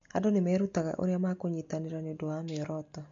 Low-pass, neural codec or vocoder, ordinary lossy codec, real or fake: 7.2 kHz; none; MP3, 48 kbps; real